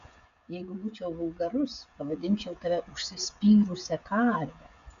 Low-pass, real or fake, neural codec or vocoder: 7.2 kHz; fake; codec, 16 kHz, 16 kbps, FreqCodec, smaller model